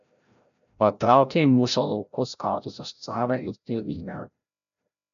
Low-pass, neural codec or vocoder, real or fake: 7.2 kHz; codec, 16 kHz, 0.5 kbps, FreqCodec, larger model; fake